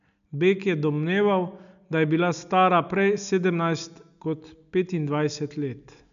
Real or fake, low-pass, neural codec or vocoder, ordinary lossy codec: real; 7.2 kHz; none; none